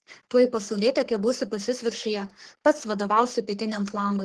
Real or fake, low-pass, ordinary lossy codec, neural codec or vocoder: fake; 10.8 kHz; Opus, 16 kbps; codec, 44.1 kHz, 3.4 kbps, Pupu-Codec